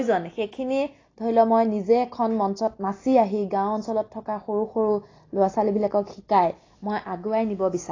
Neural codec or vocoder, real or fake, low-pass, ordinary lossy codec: none; real; 7.2 kHz; AAC, 32 kbps